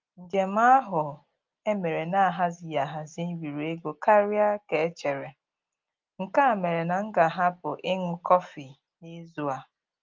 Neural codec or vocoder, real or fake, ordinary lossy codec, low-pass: none; real; Opus, 24 kbps; 7.2 kHz